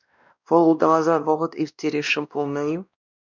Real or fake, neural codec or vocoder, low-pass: fake; codec, 16 kHz, 1 kbps, X-Codec, WavLM features, trained on Multilingual LibriSpeech; 7.2 kHz